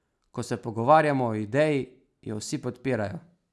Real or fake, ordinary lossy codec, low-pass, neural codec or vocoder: real; none; none; none